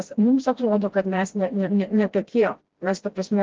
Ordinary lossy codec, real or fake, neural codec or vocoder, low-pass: Opus, 16 kbps; fake; codec, 16 kHz, 1 kbps, FreqCodec, smaller model; 7.2 kHz